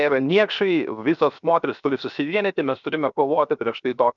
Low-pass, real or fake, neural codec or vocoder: 7.2 kHz; fake; codec, 16 kHz, 0.7 kbps, FocalCodec